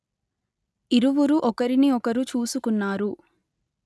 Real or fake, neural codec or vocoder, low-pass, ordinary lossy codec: real; none; none; none